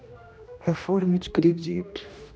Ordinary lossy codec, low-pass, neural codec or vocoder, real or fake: none; none; codec, 16 kHz, 1 kbps, X-Codec, HuBERT features, trained on general audio; fake